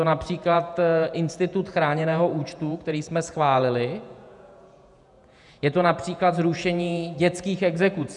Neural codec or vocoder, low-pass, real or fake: vocoder, 48 kHz, 128 mel bands, Vocos; 10.8 kHz; fake